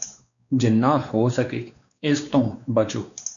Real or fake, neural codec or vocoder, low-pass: fake; codec, 16 kHz, 2 kbps, X-Codec, WavLM features, trained on Multilingual LibriSpeech; 7.2 kHz